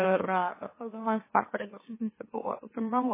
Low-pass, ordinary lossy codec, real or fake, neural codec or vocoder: 3.6 kHz; MP3, 16 kbps; fake; autoencoder, 44.1 kHz, a latent of 192 numbers a frame, MeloTTS